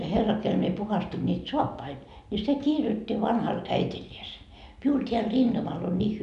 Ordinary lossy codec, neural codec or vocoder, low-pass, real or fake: none; none; 10.8 kHz; real